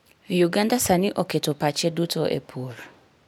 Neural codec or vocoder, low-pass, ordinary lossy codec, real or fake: none; none; none; real